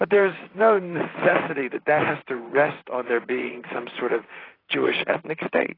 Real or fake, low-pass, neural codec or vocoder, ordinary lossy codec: fake; 5.4 kHz; vocoder, 44.1 kHz, 128 mel bands, Pupu-Vocoder; AAC, 24 kbps